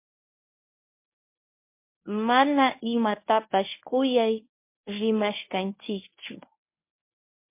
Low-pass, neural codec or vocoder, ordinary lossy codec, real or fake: 3.6 kHz; codec, 24 kHz, 0.9 kbps, WavTokenizer, medium speech release version 1; MP3, 24 kbps; fake